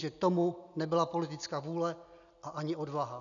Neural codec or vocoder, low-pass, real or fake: none; 7.2 kHz; real